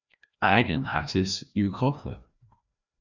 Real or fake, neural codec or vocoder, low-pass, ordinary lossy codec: fake; codec, 16 kHz, 1 kbps, FreqCodec, larger model; 7.2 kHz; Opus, 64 kbps